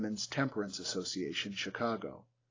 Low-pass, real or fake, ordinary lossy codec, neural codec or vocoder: 7.2 kHz; real; AAC, 32 kbps; none